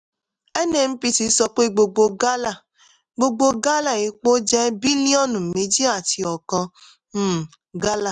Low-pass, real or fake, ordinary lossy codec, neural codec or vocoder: 9.9 kHz; real; none; none